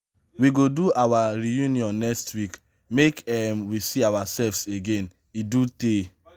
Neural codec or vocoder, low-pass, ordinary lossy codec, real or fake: none; 19.8 kHz; Opus, 32 kbps; real